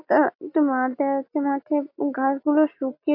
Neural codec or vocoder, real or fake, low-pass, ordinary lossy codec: none; real; 5.4 kHz; AAC, 32 kbps